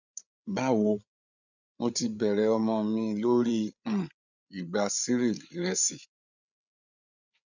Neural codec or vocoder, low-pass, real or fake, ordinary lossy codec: codec, 16 kHz, 8 kbps, FreqCodec, larger model; 7.2 kHz; fake; none